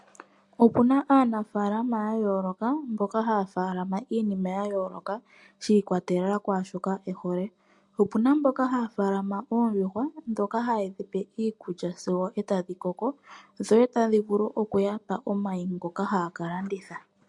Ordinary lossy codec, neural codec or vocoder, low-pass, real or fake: MP3, 64 kbps; none; 10.8 kHz; real